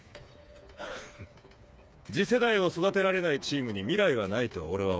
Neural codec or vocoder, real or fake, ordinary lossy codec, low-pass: codec, 16 kHz, 4 kbps, FreqCodec, smaller model; fake; none; none